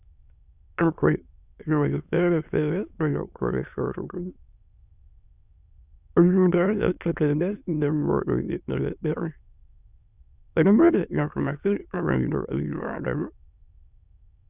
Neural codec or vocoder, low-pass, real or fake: autoencoder, 22.05 kHz, a latent of 192 numbers a frame, VITS, trained on many speakers; 3.6 kHz; fake